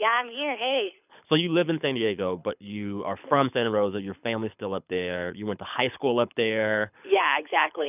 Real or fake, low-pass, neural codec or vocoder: fake; 3.6 kHz; codec, 24 kHz, 6 kbps, HILCodec